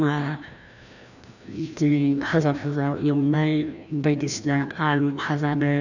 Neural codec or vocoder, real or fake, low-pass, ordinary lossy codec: codec, 16 kHz, 1 kbps, FreqCodec, larger model; fake; 7.2 kHz; none